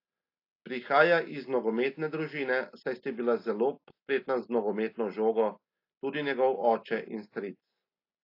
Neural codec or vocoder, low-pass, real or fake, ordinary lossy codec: none; 5.4 kHz; real; AAC, 32 kbps